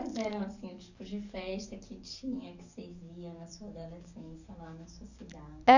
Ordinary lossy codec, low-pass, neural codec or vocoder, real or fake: none; 7.2 kHz; codec, 44.1 kHz, 7.8 kbps, DAC; fake